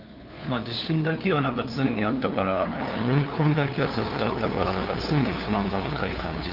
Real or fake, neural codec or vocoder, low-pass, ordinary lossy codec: fake; codec, 16 kHz, 2 kbps, FunCodec, trained on LibriTTS, 25 frames a second; 5.4 kHz; Opus, 32 kbps